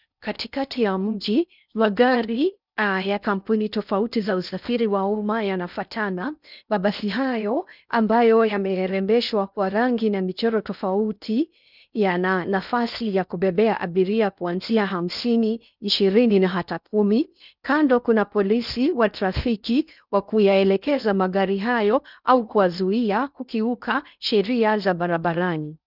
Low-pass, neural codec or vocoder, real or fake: 5.4 kHz; codec, 16 kHz in and 24 kHz out, 0.6 kbps, FocalCodec, streaming, 4096 codes; fake